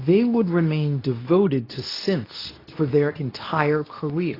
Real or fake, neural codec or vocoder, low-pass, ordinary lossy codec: fake; codec, 24 kHz, 0.9 kbps, WavTokenizer, medium speech release version 2; 5.4 kHz; AAC, 24 kbps